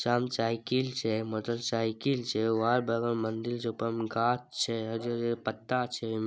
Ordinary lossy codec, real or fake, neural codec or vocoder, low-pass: none; real; none; none